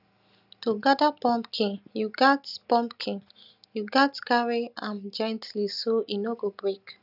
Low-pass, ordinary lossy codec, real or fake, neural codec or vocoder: 5.4 kHz; none; real; none